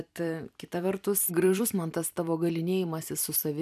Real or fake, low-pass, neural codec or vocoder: fake; 14.4 kHz; vocoder, 44.1 kHz, 128 mel bands every 256 samples, BigVGAN v2